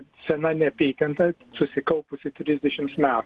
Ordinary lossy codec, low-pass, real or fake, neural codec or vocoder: Opus, 16 kbps; 10.8 kHz; real; none